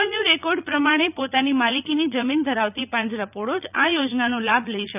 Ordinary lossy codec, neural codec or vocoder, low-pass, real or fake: none; vocoder, 44.1 kHz, 80 mel bands, Vocos; 3.6 kHz; fake